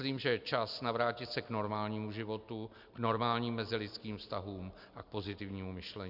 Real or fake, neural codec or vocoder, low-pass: real; none; 5.4 kHz